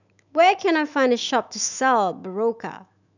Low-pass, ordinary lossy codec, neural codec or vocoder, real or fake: 7.2 kHz; none; autoencoder, 48 kHz, 128 numbers a frame, DAC-VAE, trained on Japanese speech; fake